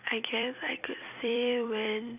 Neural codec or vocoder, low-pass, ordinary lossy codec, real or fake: vocoder, 44.1 kHz, 128 mel bands every 256 samples, BigVGAN v2; 3.6 kHz; none; fake